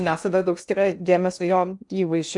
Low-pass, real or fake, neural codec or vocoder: 10.8 kHz; fake; codec, 16 kHz in and 24 kHz out, 0.8 kbps, FocalCodec, streaming, 65536 codes